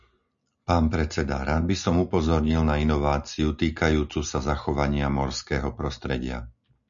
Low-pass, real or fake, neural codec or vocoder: 7.2 kHz; real; none